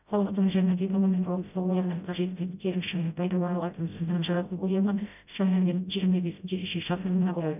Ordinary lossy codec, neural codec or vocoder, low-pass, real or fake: none; codec, 16 kHz, 0.5 kbps, FreqCodec, smaller model; 3.6 kHz; fake